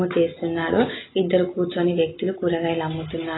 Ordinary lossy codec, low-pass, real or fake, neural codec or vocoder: AAC, 16 kbps; 7.2 kHz; real; none